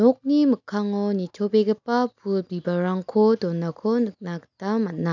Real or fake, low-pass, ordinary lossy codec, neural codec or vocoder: real; 7.2 kHz; none; none